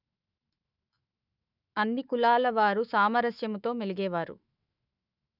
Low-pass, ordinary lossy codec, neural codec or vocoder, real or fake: 5.4 kHz; none; autoencoder, 48 kHz, 128 numbers a frame, DAC-VAE, trained on Japanese speech; fake